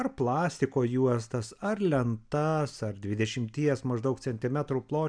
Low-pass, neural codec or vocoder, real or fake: 9.9 kHz; none; real